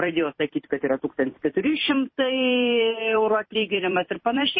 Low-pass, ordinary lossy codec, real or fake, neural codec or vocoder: 7.2 kHz; MP3, 24 kbps; fake; vocoder, 44.1 kHz, 128 mel bands every 256 samples, BigVGAN v2